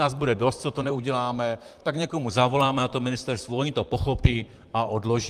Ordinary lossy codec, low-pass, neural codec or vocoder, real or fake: Opus, 32 kbps; 14.4 kHz; vocoder, 44.1 kHz, 128 mel bands, Pupu-Vocoder; fake